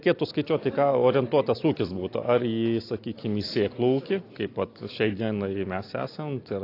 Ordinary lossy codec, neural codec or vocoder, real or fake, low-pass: AAC, 32 kbps; none; real; 5.4 kHz